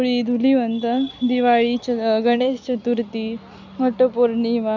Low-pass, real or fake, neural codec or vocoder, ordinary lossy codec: 7.2 kHz; real; none; none